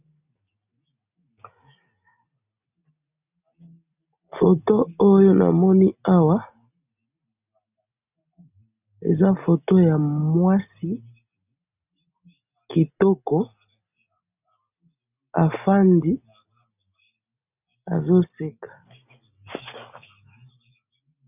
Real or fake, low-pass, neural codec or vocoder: real; 3.6 kHz; none